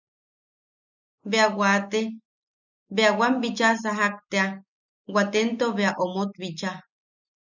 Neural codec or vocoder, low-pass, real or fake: none; 7.2 kHz; real